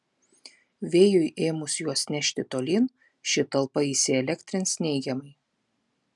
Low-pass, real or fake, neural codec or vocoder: 10.8 kHz; real; none